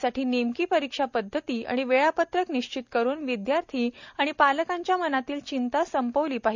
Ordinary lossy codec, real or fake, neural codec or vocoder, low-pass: none; real; none; 7.2 kHz